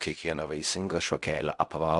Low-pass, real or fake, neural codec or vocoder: 10.8 kHz; fake; codec, 16 kHz in and 24 kHz out, 0.4 kbps, LongCat-Audio-Codec, fine tuned four codebook decoder